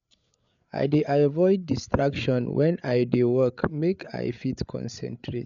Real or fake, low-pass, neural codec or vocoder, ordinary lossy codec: fake; 7.2 kHz; codec, 16 kHz, 8 kbps, FreqCodec, larger model; none